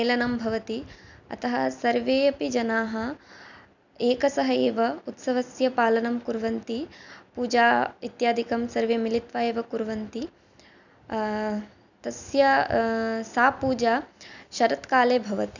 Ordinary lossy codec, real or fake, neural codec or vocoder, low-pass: none; real; none; 7.2 kHz